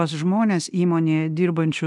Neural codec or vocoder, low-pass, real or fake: codec, 24 kHz, 0.9 kbps, DualCodec; 10.8 kHz; fake